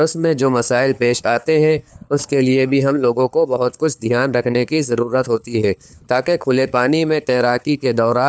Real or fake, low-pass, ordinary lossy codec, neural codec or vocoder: fake; none; none; codec, 16 kHz, 4 kbps, FunCodec, trained on LibriTTS, 50 frames a second